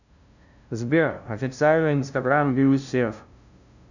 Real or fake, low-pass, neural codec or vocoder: fake; 7.2 kHz; codec, 16 kHz, 0.5 kbps, FunCodec, trained on LibriTTS, 25 frames a second